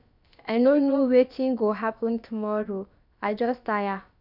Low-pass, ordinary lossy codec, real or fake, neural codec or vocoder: 5.4 kHz; none; fake; codec, 16 kHz, about 1 kbps, DyCAST, with the encoder's durations